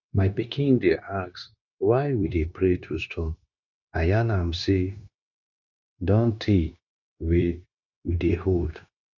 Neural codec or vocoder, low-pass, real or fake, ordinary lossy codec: codec, 16 kHz, 0.9 kbps, LongCat-Audio-Codec; 7.2 kHz; fake; none